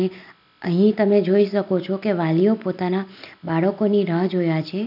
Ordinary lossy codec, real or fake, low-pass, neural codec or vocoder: none; real; 5.4 kHz; none